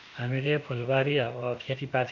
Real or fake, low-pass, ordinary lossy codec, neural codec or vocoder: fake; 7.2 kHz; none; codec, 16 kHz, 0.8 kbps, ZipCodec